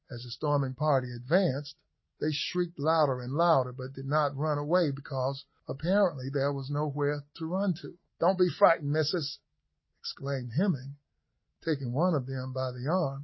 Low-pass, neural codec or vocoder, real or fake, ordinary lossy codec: 7.2 kHz; none; real; MP3, 24 kbps